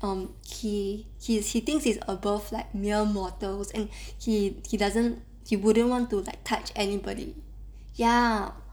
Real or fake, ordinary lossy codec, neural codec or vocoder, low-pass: real; none; none; none